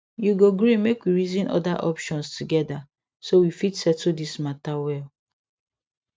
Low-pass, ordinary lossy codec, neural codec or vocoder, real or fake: none; none; none; real